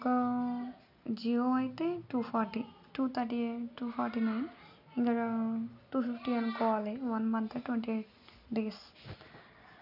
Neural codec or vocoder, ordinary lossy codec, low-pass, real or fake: none; none; 5.4 kHz; real